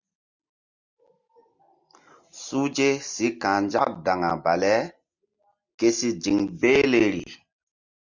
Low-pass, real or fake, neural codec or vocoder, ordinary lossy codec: 7.2 kHz; real; none; Opus, 64 kbps